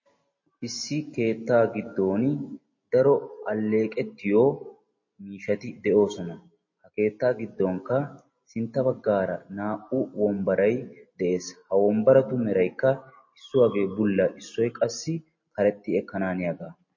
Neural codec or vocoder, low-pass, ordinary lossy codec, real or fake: none; 7.2 kHz; MP3, 32 kbps; real